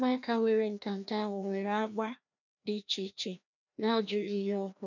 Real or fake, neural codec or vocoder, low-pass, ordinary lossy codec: fake; codec, 16 kHz, 1 kbps, FreqCodec, larger model; 7.2 kHz; none